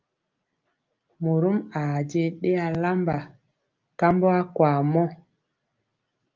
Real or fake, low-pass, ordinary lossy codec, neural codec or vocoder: real; 7.2 kHz; Opus, 24 kbps; none